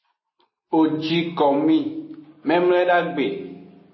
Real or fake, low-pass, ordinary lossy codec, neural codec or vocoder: real; 7.2 kHz; MP3, 24 kbps; none